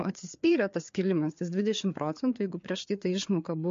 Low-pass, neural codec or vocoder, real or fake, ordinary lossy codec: 7.2 kHz; codec, 16 kHz, 4 kbps, FreqCodec, larger model; fake; MP3, 48 kbps